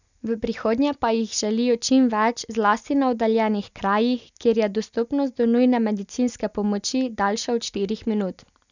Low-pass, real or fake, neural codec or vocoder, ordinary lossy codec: 7.2 kHz; real; none; none